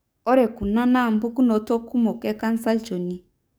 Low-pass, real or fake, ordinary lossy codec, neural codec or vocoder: none; fake; none; codec, 44.1 kHz, 7.8 kbps, DAC